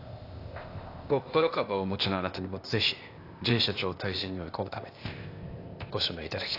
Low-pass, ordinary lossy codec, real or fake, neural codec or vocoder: 5.4 kHz; AAC, 32 kbps; fake; codec, 16 kHz, 0.8 kbps, ZipCodec